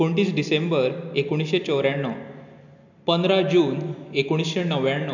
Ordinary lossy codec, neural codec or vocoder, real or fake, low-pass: none; none; real; 7.2 kHz